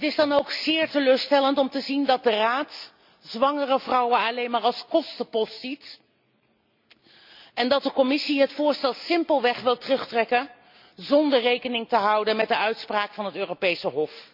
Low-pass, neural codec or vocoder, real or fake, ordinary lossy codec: 5.4 kHz; none; real; MP3, 48 kbps